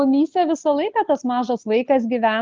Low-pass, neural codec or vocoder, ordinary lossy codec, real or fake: 7.2 kHz; none; Opus, 24 kbps; real